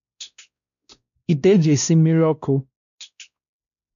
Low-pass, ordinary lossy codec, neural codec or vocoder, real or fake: 7.2 kHz; none; codec, 16 kHz, 1 kbps, X-Codec, WavLM features, trained on Multilingual LibriSpeech; fake